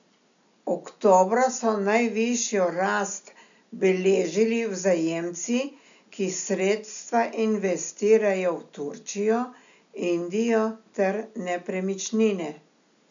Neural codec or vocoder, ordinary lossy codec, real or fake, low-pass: none; none; real; 7.2 kHz